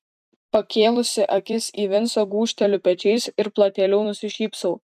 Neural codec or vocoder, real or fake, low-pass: vocoder, 44.1 kHz, 128 mel bands every 256 samples, BigVGAN v2; fake; 14.4 kHz